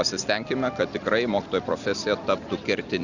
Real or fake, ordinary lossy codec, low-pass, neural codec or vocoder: real; Opus, 64 kbps; 7.2 kHz; none